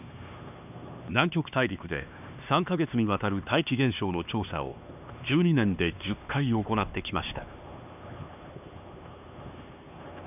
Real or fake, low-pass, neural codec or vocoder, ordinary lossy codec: fake; 3.6 kHz; codec, 16 kHz, 2 kbps, X-Codec, HuBERT features, trained on LibriSpeech; none